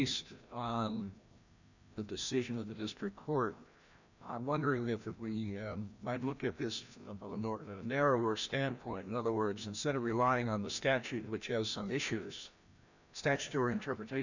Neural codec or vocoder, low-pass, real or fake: codec, 16 kHz, 1 kbps, FreqCodec, larger model; 7.2 kHz; fake